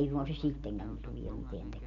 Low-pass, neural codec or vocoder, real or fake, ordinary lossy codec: 7.2 kHz; none; real; none